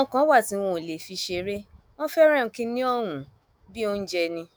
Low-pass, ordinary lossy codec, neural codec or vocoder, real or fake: none; none; autoencoder, 48 kHz, 128 numbers a frame, DAC-VAE, trained on Japanese speech; fake